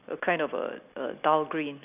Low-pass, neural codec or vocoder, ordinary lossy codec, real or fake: 3.6 kHz; none; none; real